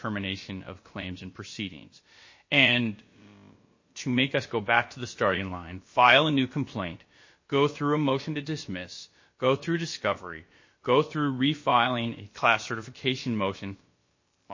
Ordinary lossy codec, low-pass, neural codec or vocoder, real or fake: MP3, 32 kbps; 7.2 kHz; codec, 16 kHz, about 1 kbps, DyCAST, with the encoder's durations; fake